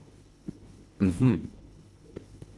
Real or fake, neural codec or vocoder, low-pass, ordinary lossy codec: fake; codec, 24 kHz, 1.5 kbps, HILCodec; none; none